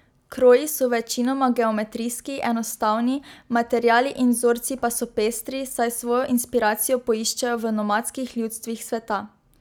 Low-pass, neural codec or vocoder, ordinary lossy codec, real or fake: none; none; none; real